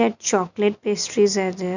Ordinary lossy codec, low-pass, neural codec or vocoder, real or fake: none; 7.2 kHz; none; real